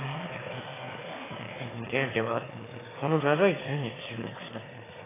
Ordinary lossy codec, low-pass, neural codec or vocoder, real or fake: AAC, 16 kbps; 3.6 kHz; autoencoder, 22.05 kHz, a latent of 192 numbers a frame, VITS, trained on one speaker; fake